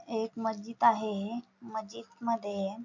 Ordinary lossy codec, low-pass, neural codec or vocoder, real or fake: none; 7.2 kHz; none; real